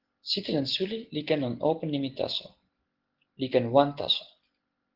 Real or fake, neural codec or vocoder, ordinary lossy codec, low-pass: real; none; Opus, 16 kbps; 5.4 kHz